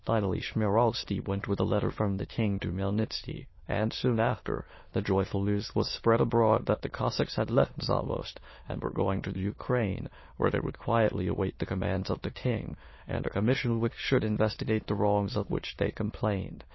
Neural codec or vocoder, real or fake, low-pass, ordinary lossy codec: autoencoder, 22.05 kHz, a latent of 192 numbers a frame, VITS, trained on many speakers; fake; 7.2 kHz; MP3, 24 kbps